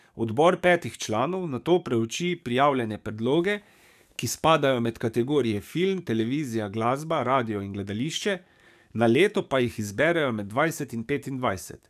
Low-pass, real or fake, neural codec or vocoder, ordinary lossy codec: 14.4 kHz; fake; codec, 44.1 kHz, 7.8 kbps, DAC; none